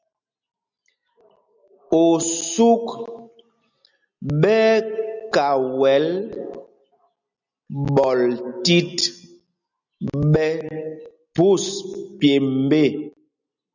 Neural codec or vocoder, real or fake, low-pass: none; real; 7.2 kHz